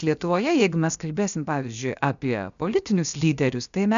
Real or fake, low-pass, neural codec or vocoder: fake; 7.2 kHz; codec, 16 kHz, 0.7 kbps, FocalCodec